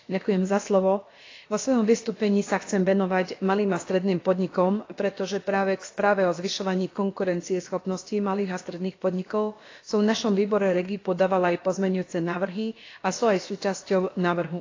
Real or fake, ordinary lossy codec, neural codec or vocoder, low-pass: fake; AAC, 32 kbps; codec, 16 kHz, about 1 kbps, DyCAST, with the encoder's durations; 7.2 kHz